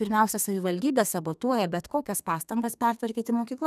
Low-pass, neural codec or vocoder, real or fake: 14.4 kHz; codec, 32 kHz, 1.9 kbps, SNAC; fake